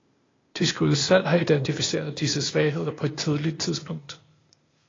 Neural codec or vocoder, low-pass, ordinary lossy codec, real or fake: codec, 16 kHz, 0.8 kbps, ZipCodec; 7.2 kHz; AAC, 32 kbps; fake